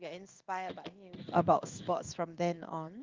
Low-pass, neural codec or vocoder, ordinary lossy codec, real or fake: 7.2 kHz; codec, 16 kHz in and 24 kHz out, 1 kbps, XY-Tokenizer; Opus, 24 kbps; fake